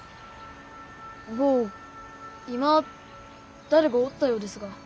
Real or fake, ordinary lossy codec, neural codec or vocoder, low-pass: real; none; none; none